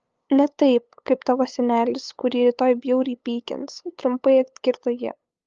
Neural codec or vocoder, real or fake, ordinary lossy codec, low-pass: codec, 16 kHz, 8 kbps, FunCodec, trained on LibriTTS, 25 frames a second; fake; Opus, 24 kbps; 7.2 kHz